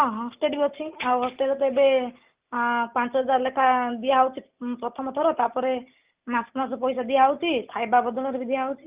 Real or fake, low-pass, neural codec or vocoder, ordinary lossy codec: real; 3.6 kHz; none; Opus, 32 kbps